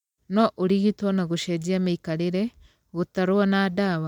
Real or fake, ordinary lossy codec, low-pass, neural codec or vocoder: real; MP3, 96 kbps; 19.8 kHz; none